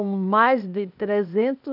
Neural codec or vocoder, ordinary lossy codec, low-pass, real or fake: codec, 16 kHz, 0.8 kbps, ZipCodec; none; 5.4 kHz; fake